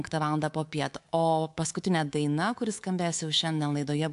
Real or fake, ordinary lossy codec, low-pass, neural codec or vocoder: fake; AAC, 64 kbps; 10.8 kHz; codec, 24 kHz, 3.1 kbps, DualCodec